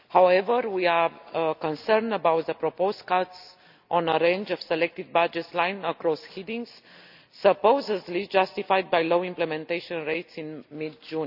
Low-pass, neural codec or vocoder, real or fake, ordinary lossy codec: 5.4 kHz; none; real; none